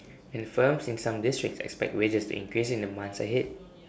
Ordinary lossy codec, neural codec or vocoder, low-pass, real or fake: none; none; none; real